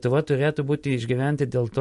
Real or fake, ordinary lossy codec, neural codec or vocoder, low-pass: real; MP3, 48 kbps; none; 14.4 kHz